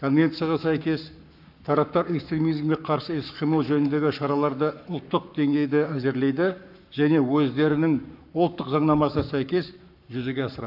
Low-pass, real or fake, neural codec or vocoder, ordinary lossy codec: 5.4 kHz; fake; codec, 44.1 kHz, 7.8 kbps, Pupu-Codec; none